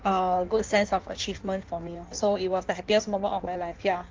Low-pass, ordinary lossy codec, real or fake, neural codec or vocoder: 7.2 kHz; Opus, 24 kbps; fake; codec, 16 kHz in and 24 kHz out, 1.1 kbps, FireRedTTS-2 codec